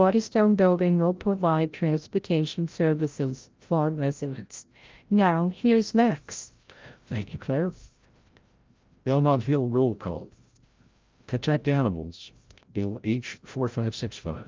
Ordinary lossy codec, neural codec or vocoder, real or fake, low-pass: Opus, 24 kbps; codec, 16 kHz, 0.5 kbps, FreqCodec, larger model; fake; 7.2 kHz